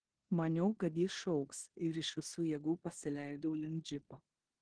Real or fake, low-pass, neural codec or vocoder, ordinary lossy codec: fake; 9.9 kHz; codec, 16 kHz in and 24 kHz out, 0.9 kbps, LongCat-Audio-Codec, four codebook decoder; Opus, 16 kbps